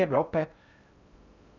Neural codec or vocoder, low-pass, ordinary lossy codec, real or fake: codec, 16 kHz in and 24 kHz out, 0.6 kbps, FocalCodec, streaming, 4096 codes; 7.2 kHz; none; fake